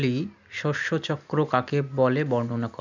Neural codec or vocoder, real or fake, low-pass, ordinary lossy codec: none; real; 7.2 kHz; none